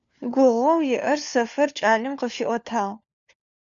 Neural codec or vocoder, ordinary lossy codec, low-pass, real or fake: codec, 16 kHz, 4 kbps, FunCodec, trained on LibriTTS, 50 frames a second; MP3, 96 kbps; 7.2 kHz; fake